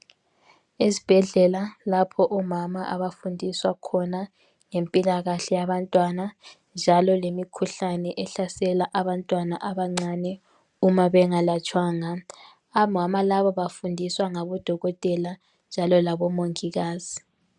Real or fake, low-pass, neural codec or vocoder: real; 10.8 kHz; none